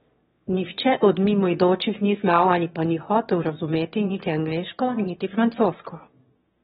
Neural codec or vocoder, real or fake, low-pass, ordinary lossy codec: autoencoder, 22.05 kHz, a latent of 192 numbers a frame, VITS, trained on one speaker; fake; 9.9 kHz; AAC, 16 kbps